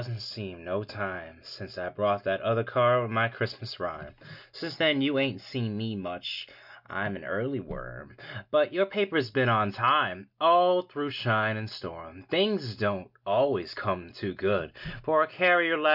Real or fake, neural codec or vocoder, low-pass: real; none; 5.4 kHz